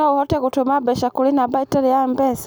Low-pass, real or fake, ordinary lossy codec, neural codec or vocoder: none; real; none; none